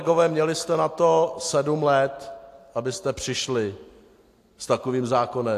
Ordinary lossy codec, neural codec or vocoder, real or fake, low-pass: AAC, 64 kbps; none; real; 14.4 kHz